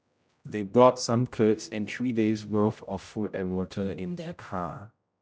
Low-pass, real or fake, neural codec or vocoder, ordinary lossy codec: none; fake; codec, 16 kHz, 0.5 kbps, X-Codec, HuBERT features, trained on general audio; none